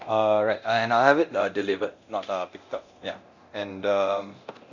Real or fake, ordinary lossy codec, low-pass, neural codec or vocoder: fake; Opus, 64 kbps; 7.2 kHz; codec, 24 kHz, 0.9 kbps, DualCodec